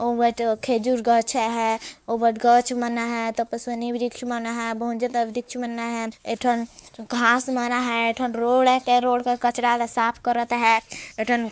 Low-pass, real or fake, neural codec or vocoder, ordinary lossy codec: none; fake; codec, 16 kHz, 4 kbps, X-Codec, WavLM features, trained on Multilingual LibriSpeech; none